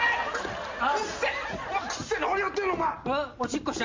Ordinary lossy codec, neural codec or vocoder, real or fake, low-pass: MP3, 48 kbps; none; real; 7.2 kHz